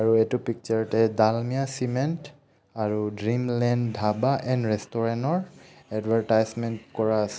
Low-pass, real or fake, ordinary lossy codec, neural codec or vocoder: none; real; none; none